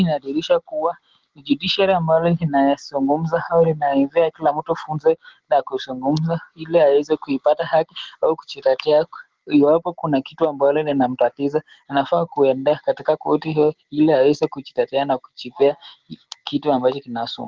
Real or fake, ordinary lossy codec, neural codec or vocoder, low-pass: real; Opus, 16 kbps; none; 7.2 kHz